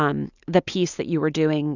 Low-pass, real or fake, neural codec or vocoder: 7.2 kHz; real; none